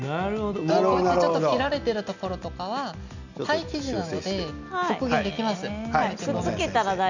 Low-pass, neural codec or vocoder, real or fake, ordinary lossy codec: 7.2 kHz; none; real; none